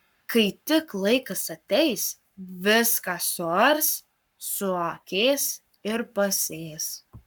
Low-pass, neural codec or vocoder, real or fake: 19.8 kHz; codec, 44.1 kHz, 7.8 kbps, Pupu-Codec; fake